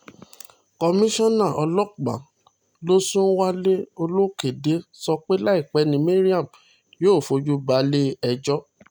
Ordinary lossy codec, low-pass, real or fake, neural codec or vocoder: none; none; real; none